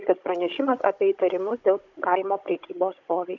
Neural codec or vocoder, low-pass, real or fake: codec, 16 kHz, 16 kbps, FunCodec, trained on Chinese and English, 50 frames a second; 7.2 kHz; fake